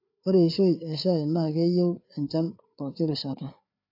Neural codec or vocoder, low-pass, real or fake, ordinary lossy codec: codec, 16 kHz, 4 kbps, FreqCodec, larger model; 5.4 kHz; fake; AAC, 32 kbps